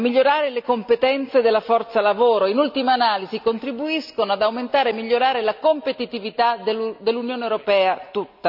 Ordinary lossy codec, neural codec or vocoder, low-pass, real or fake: none; none; 5.4 kHz; real